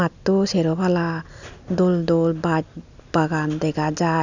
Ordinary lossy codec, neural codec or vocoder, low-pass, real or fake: none; none; 7.2 kHz; real